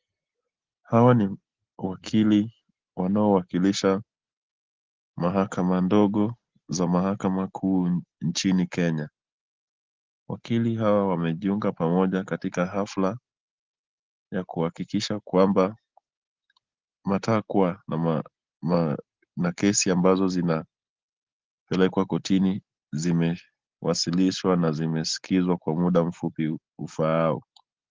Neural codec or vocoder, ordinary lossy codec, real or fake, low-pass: none; Opus, 16 kbps; real; 7.2 kHz